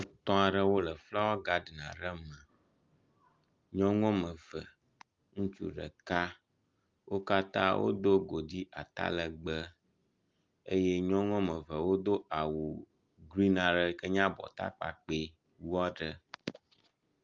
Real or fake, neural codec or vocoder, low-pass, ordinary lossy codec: real; none; 7.2 kHz; Opus, 32 kbps